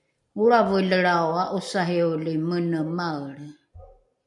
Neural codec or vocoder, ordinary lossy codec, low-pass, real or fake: none; MP3, 96 kbps; 10.8 kHz; real